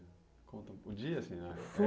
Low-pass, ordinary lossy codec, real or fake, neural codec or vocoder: none; none; real; none